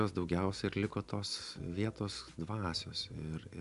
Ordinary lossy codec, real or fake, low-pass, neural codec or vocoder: AAC, 96 kbps; real; 10.8 kHz; none